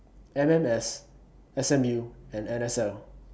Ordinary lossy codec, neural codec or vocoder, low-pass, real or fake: none; none; none; real